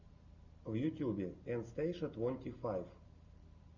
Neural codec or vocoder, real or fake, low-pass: none; real; 7.2 kHz